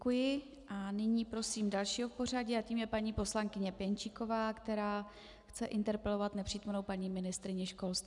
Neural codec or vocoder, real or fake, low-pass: none; real; 10.8 kHz